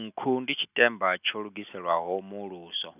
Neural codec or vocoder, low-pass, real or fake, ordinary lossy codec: none; 3.6 kHz; real; none